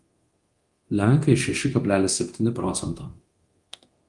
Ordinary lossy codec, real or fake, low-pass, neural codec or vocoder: Opus, 24 kbps; fake; 10.8 kHz; codec, 24 kHz, 0.9 kbps, DualCodec